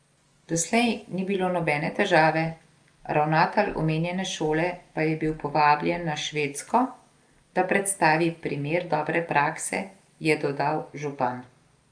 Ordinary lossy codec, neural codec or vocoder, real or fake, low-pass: Opus, 32 kbps; none; real; 9.9 kHz